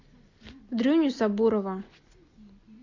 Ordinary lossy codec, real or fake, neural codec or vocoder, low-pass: AAC, 48 kbps; real; none; 7.2 kHz